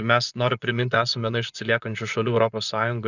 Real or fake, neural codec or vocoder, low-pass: fake; vocoder, 24 kHz, 100 mel bands, Vocos; 7.2 kHz